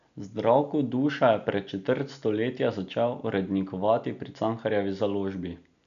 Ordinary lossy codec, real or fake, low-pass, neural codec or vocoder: none; real; 7.2 kHz; none